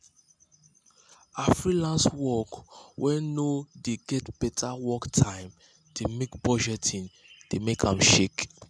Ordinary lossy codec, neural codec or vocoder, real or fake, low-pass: none; none; real; none